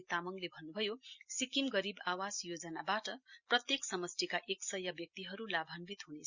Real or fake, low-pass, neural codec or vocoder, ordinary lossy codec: real; 7.2 kHz; none; Opus, 64 kbps